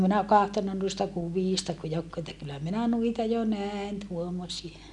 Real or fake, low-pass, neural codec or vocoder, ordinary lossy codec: real; 10.8 kHz; none; none